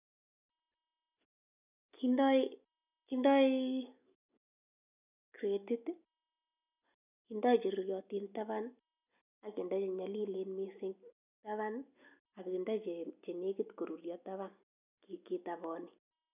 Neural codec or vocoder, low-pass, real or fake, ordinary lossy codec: none; 3.6 kHz; real; none